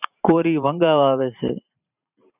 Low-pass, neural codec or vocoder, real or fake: 3.6 kHz; vocoder, 44.1 kHz, 128 mel bands every 512 samples, BigVGAN v2; fake